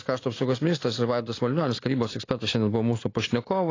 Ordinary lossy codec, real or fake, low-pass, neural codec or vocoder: AAC, 32 kbps; real; 7.2 kHz; none